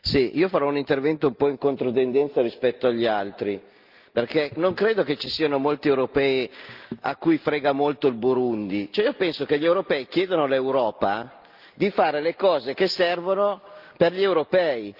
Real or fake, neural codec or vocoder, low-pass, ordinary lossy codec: real; none; 5.4 kHz; Opus, 24 kbps